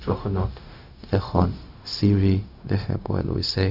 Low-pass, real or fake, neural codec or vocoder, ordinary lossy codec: 5.4 kHz; fake; codec, 16 kHz, 0.4 kbps, LongCat-Audio-Codec; none